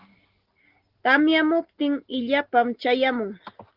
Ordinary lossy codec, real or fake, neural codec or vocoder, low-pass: Opus, 16 kbps; real; none; 5.4 kHz